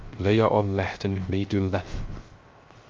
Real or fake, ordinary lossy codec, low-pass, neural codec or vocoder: fake; Opus, 32 kbps; 7.2 kHz; codec, 16 kHz, 0.3 kbps, FocalCodec